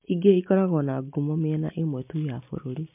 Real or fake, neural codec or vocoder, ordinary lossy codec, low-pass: real; none; MP3, 32 kbps; 3.6 kHz